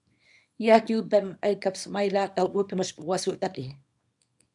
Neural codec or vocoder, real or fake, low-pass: codec, 24 kHz, 0.9 kbps, WavTokenizer, small release; fake; 10.8 kHz